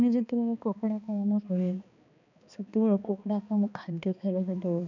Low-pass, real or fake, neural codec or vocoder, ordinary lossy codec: 7.2 kHz; fake; codec, 16 kHz, 2 kbps, X-Codec, HuBERT features, trained on balanced general audio; none